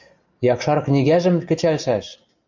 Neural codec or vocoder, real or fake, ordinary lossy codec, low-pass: none; real; MP3, 64 kbps; 7.2 kHz